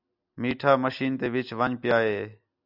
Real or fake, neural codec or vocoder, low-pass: real; none; 5.4 kHz